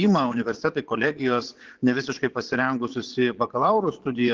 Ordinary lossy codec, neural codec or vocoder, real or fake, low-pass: Opus, 16 kbps; vocoder, 22.05 kHz, 80 mel bands, Vocos; fake; 7.2 kHz